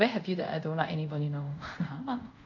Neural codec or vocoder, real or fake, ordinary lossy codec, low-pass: codec, 24 kHz, 0.5 kbps, DualCodec; fake; none; 7.2 kHz